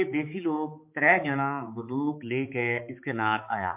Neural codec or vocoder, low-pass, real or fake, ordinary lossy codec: codec, 16 kHz, 4 kbps, X-Codec, HuBERT features, trained on balanced general audio; 3.6 kHz; fake; MP3, 32 kbps